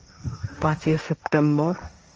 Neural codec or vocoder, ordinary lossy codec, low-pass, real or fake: codec, 16 kHz, 1.1 kbps, Voila-Tokenizer; Opus, 24 kbps; 7.2 kHz; fake